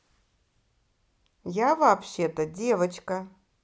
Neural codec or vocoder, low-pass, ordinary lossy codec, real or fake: none; none; none; real